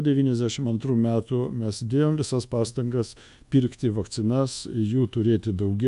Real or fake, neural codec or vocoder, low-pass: fake; codec, 24 kHz, 1.2 kbps, DualCodec; 10.8 kHz